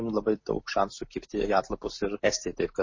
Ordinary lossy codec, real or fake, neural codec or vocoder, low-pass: MP3, 32 kbps; real; none; 7.2 kHz